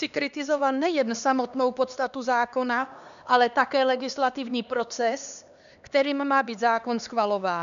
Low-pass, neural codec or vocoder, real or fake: 7.2 kHz; codec, 16 kHz, 2 kbps, X-Codec, HuBERT features, trained on LibriSpeech; fake